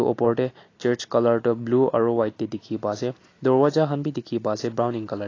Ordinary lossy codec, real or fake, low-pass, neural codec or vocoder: AAC, 32 kbps; real; 7.2 kHz; none